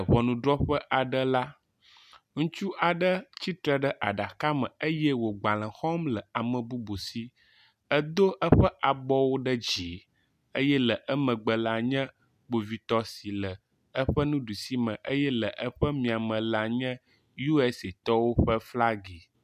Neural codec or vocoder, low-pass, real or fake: none; 14.4 kHz; real